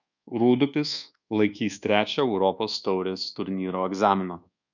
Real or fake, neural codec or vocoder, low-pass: fake; codec, 24 kHz, 1.2 kbps, DualCodec; 7.2 kHz